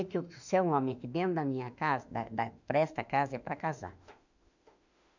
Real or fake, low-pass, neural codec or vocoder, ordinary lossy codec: fake; 7.2 kHz; autoencoder, 48 kHz, 32 numbers a frame, DAC-VAE, trained on Japanese speech; none